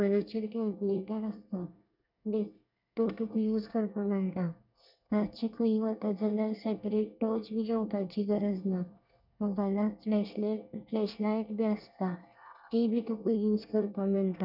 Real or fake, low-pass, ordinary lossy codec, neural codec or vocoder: fake; 5.4 kHz; none; codec, 24 kHz, 1 kbps, SNAC